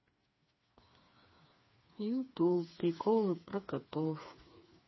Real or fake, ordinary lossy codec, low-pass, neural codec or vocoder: fake; MP3, 24 kbps; 7.2 kHz; codec, 16 kHz, 4 kbps, FreqCodec, smaller model